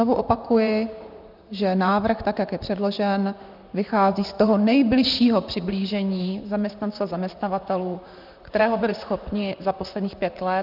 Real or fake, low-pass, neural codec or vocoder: fake; 5.4 kHz; vocoder, 44.1 kHz, 128 mel bands, Pupu-Vocoder